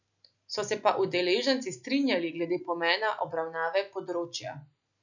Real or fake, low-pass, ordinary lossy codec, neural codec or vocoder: real; 7.2 kHz; none; none